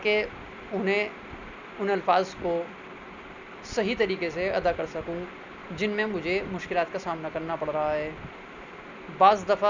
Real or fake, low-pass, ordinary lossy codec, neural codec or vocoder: real; 7.2 kHz; none; none